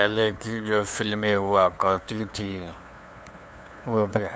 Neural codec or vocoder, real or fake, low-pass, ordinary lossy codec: codec, 16 kHz, 2 kbps, FunCodec, trained on LibriTTS, 25 frames a second; fake; none; none